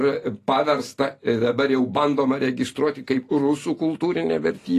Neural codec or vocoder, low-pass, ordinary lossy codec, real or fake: none; 14.4 kHz; AAC, 48 kbps; real